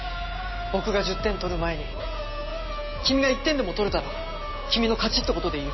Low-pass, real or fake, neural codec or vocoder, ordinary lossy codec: 7.2 kHz; real; none; MP3, 24 kbps